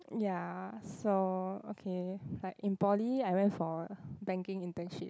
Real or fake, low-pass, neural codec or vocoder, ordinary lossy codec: real; none; none; none